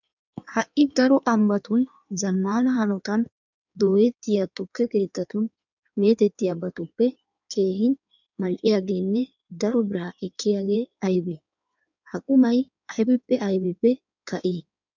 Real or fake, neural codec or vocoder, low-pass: fake; codec, 16 kHz in and 24 kHz out, 1.1 kbps, FireRedTTS-2 codec; 7.2 kHz